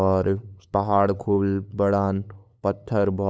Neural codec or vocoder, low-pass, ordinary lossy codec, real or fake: codec, 16 kHz, 8 kbps, FunCodec, trained on LibriTTS, 25 frames a second; none; none; fake